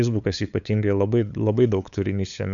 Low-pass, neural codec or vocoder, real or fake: 7.2 kHz; codec, 16 kHz, 8 kbps, FunCodec, trained on LibriTTS, 25 frames a second; fake